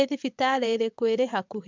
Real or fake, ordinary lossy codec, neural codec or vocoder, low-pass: fake; MP3, 64 kbps; vocoder, 22.05 kHz, 80 mel bands, WaveNeXt; 7.2 kHz